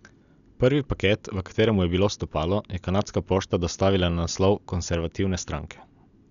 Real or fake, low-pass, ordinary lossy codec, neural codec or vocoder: real; 7.2 kHz; none; none